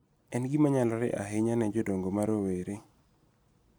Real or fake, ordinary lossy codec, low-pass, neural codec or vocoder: real; none; none; none